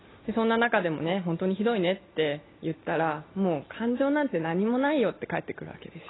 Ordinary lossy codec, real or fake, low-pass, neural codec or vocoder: AAC, 16 kbps; real; 7.2 kHz; none